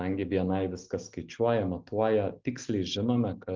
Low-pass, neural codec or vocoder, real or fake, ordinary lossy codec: 7.2 kHz; none; real; Opus, 32 kbps